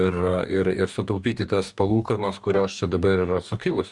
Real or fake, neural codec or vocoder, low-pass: fake; codec, 44.1 kHz, 2.6 kbps, DAC; 10.8 kHz